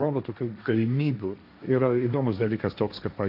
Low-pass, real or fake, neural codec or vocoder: 5.4 kHz; fake; codec, 16 kHz, 1.1 kbps, Voila-Tokenizer